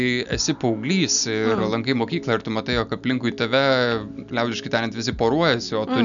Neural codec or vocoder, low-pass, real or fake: none; 7.2 kHz; real